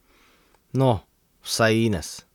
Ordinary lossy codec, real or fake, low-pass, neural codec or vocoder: none; fake; 19.8 kHz; vocoder, 44.1 kHz, 128 mel bands, Pupu-Vocoder